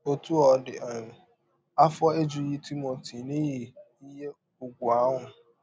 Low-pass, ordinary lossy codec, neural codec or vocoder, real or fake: none; none; none; real